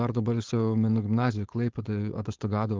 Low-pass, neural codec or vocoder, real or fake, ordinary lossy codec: 7.2 kHz; codec, 16 kHz, 4.8 kbps, FACodec; fake; Opus, 16 kbps